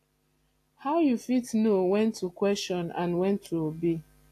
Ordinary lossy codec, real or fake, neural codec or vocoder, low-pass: AAC, 64 kbps; real; none; 14.4 kHz